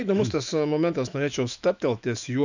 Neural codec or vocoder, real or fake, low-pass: autoencoder, 48 kHz, 128 numbers a frame, DAC-VAE, trained on Japanese speech; fake; 7.2 kHz